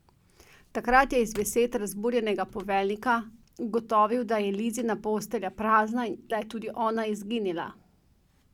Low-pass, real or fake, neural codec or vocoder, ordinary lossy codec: 19.8 kHz; real; none; none